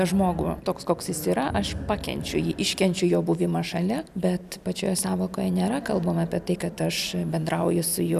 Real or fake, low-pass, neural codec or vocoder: real; 14.4 kHz; none